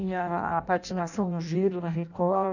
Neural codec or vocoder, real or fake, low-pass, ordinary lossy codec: codec, 16 kHz in and 24 kHz out, 0.6 kbps, FireRedTTS-2 codec; fake; 7.2 kHz; none